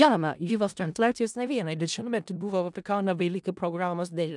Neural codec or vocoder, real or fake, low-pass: codec, 16 kHz in and 24 kHz out, 0.4 kbps, LongCat-Audio-Codec, four codebook decoder; fake; 10.8 kHz